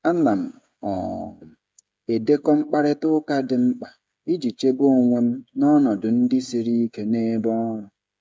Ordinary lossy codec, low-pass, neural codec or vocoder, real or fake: none; none; codec, 16 kHz, 16 kbps, FreqCodec, smaller model; fake